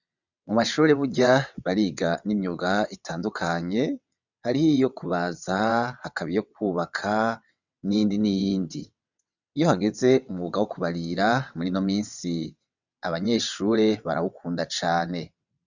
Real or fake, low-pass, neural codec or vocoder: fake; 7.2 kHz; vocoder, 22.05 kHz, 80 mel bands, WaveNeXt